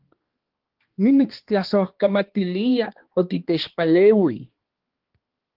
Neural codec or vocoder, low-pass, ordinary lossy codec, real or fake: codec, 16 kHz, 2 kbps, X-Codec, HuBERT features, trained on balanced general audio; 5.4 kHz; Opus, 16 kbps; fake